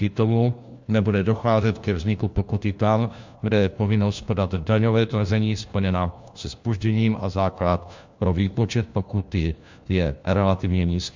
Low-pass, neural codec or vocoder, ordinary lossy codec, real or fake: 7.2 kHz; codec, 16 kHz, 1 kbps, FunCodec, trained on LibriTTS, 50 frames a second; MP3, 64 kbps; fake